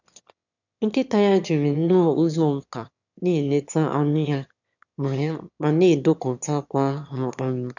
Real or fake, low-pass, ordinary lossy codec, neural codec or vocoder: fake; 7.2 kHz; none; autoencoder, 22.05 kHz, a latent of 192 numbers a frame, VITS, trained on one speaker